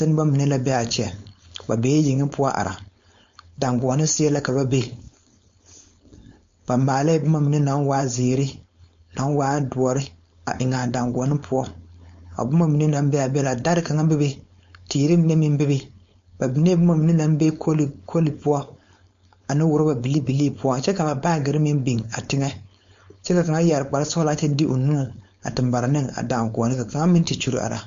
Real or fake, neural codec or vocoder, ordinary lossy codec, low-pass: fake; codec, 16 kHz, 4.8 kbps, FACodec; MP3, 48 kbps; 7.2 kHz